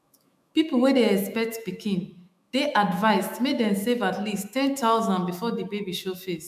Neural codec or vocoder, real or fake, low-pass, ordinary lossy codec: autoencoder, 48 kHz, 128 numbers a frame, DAC-VAE, trained on Japanese speech; fake; 14.4 kHz; MP3, 96 kbps